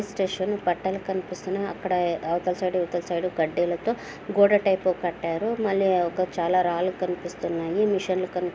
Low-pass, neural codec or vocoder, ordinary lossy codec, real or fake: none; none; none; real